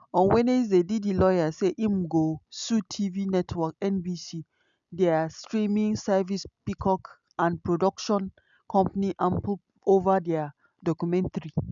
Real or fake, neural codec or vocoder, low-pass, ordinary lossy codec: real; none; 7.2 kHz; none